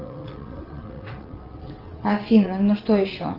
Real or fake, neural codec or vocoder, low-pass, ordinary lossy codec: fake; vocoder, 22.05 kHz, 80 mel bands, WaveNeXt; 5.4 kHz; Opus, 24 kbps